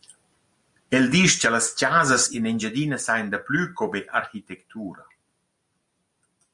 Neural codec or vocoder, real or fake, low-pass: none; real; 10.8 kHz